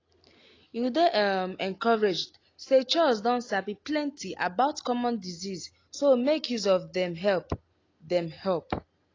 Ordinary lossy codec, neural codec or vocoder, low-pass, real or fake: AAC, 32 kbps; none; 7.2 kHz; real